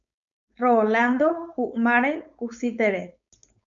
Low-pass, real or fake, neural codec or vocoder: 7.2 kHz; fake; codec, 16 kHz, 4.8 kbps, FACodec